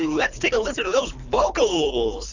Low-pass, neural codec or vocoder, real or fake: 7.2 kHz; codec, 24 kHz, 3 kbps, HILCodec; fake